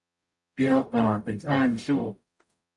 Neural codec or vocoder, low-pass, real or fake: codec, 44.1 kHz, 0.9 kbps, DAC; 10.8 kHz; fake